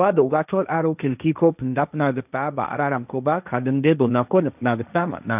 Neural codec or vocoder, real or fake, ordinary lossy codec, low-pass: codec, 16 kHz, 1.1 kbps, Voila-Tokenizer; fake; none; 3.6 kHz